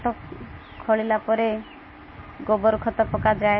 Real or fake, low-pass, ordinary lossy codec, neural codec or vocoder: real; 7.2 kHz; MP3, 24 kbps; none